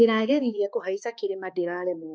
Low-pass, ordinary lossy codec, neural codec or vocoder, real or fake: none; none; codec, 16 kHz, 2 kbps, X-Codec, HuBERT features, trained on balanced general audio; fake